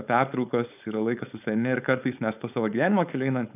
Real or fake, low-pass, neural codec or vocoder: fake; 3.6 kHz; codec, 16 kHz, 4.8 kbps, FACodec